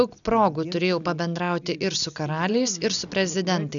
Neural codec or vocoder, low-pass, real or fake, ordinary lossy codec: none; 7.2 kHz; real; MP3, 96 kbps